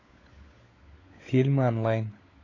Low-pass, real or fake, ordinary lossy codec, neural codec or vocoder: 7.2 kHz; fake; AAC, 32 kbps; codec, 16 kHz, 16 kbps, FunCodec, trained on LibriTTS, 50 frames a second